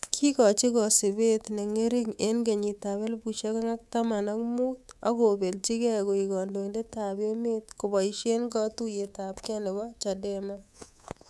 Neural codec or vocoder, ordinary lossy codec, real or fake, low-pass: codec, 24 kHz, 3.1 kbps, DualCodec; none; fake; none